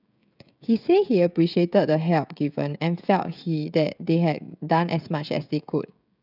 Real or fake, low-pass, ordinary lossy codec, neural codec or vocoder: fake; 5.4 kHz; none; codec, 16 kHz, 16 kbps, FreqCodec, smaller model